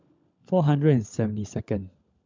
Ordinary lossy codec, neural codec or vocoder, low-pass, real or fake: MP3, 64 kbps; codec, 16 kHz, 16 kbps, FunCodec, trained on LibriTTS, 50 frames a second; 7.2 kHz; fake